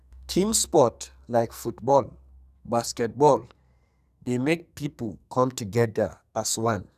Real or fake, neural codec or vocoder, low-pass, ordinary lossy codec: fake; codec, 44.1 kHz, 2.6 kbps, SNAC; 14.4 kHz; none